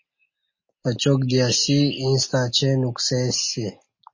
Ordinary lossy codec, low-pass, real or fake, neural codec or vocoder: MP3, 32 kbps; 7.2 kHz; real; none